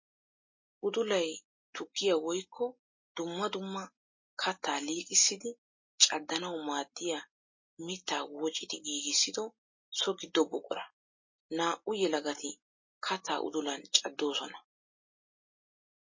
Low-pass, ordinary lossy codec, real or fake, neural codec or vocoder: 7.2 kHz; MP3, 32 kbps; real; none